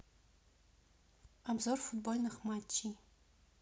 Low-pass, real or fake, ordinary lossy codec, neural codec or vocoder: none; real; none; none